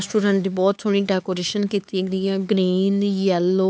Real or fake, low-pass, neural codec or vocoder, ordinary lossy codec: fake; none; codec, 16 kHz, 4 kbps, X-Codec, HuBERT features, trained on LibriSpeech; none